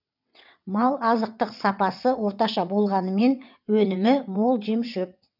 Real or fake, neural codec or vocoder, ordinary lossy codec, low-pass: fake; vocoder, 22.05 kHz, 80 mel bands, WaveNeXt; none; 5.4 kHz